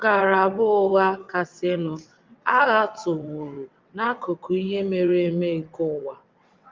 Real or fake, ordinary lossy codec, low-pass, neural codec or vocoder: fake; Opus, 24 kbps; 7.2 kHz; vocoder, 22.05 kHz, 80 mel bands, WaveNeXt